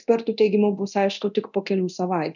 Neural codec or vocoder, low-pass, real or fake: codec, 24 kHz, 0.9 kbps, DualCodec; 7.2 kHz; fake